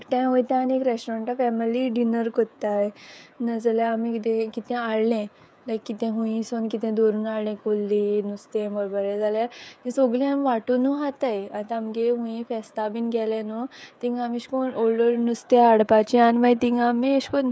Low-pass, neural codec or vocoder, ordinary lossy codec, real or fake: none; codec, 16 kHz, 16 kbps, FreqCodec, smaller model; none; fake